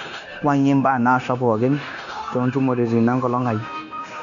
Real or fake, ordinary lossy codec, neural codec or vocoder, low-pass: fake; none; codec, 16 kHz, 0.9 kbps, LongCat-Audio-Codec; 7.2 kHz